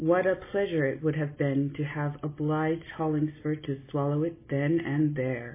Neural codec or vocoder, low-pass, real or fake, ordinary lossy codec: none; 3.6 kHz; real; MP3, 16 kbps